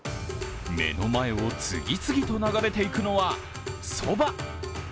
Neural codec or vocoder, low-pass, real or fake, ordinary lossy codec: none; none; real; none